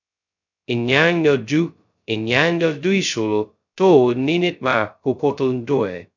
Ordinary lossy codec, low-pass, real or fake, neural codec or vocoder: none; 7.2 kHz; fake; codec, 16 kHz, 0.2 kbps, FocalCodec